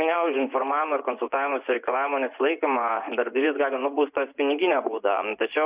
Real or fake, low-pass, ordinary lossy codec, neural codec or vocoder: fake; 3.6 kHz; Opus, 64 kbps; vocoder, 24 kHz, 100 mel bands, Vocos